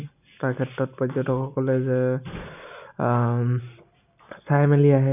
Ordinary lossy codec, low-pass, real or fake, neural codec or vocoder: none; 3.6 kHz; real; none